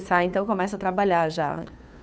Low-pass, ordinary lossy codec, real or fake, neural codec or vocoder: none; none; fake; codec, 16 kHz, 2 kbps, FunCodec, trained on Chinese and English, 25 frames a second